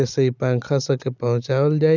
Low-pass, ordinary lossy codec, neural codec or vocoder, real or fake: 7.2 kHz; none; none; real